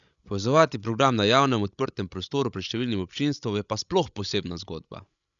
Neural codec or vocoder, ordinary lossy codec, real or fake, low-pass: codec, 16 kHz, 16 kbps, FreqCodec, larger model; none; fake; 7.2 kHz